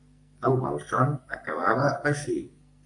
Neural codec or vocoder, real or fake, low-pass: codec, 44.1 kHz, 2.6 kbps, SNAC; fake; 10.8 kHz